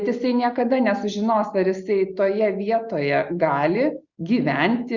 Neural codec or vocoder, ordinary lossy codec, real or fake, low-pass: none; Opus, 64 kbps; real; 7.2 kHz